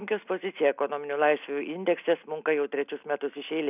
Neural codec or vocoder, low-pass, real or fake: none; 3.6 kHz; real